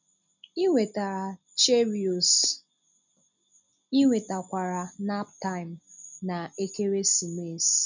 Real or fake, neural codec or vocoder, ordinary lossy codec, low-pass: real; none; none; 7.2 kHz